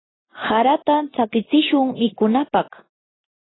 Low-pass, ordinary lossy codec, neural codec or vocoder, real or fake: 7.2 kHz; AAC, 16 kbps; none; real